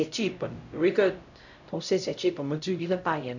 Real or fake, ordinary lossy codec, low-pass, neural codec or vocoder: fake; none; 7.2 kHz; codec, 16 kHz, 0.5 kbps, X-Codec, HuBERT features, trained on LibriSpeech